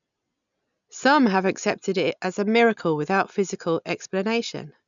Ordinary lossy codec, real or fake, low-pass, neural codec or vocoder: none; real; 7.2 kHz; none